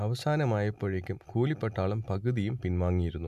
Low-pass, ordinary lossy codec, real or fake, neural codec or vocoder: 14.4 kHz; AAC, 96 kbps; real; none